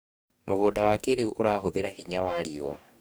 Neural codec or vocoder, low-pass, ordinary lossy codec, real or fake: codec, 44.1 kHz, 2.6 kbps, DAC; none; none; fake